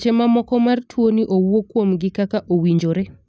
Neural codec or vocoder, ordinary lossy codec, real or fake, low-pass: none; none; real; none